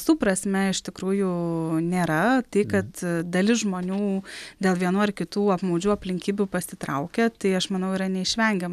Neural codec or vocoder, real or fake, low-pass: none; real; 14.4 kHz